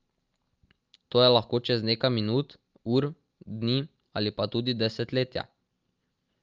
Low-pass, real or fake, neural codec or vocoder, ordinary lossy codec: 7.2 kHz; real; none; Opus, 32 kbps